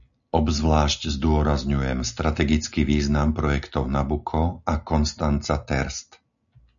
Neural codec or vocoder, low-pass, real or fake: none; 7.2 kHz; real